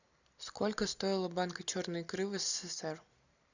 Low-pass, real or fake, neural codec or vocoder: 7.2 kHz; real; none